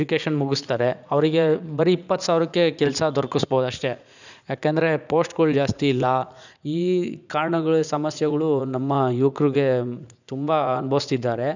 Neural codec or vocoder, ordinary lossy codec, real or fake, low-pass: vocoder, 44.1 kHz, 80 mel bands, Vocos; none; fake; 7.2 kHz